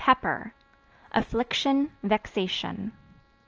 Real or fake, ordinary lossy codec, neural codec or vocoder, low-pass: real; Opus, 24 kbps; none; 7.2 kHz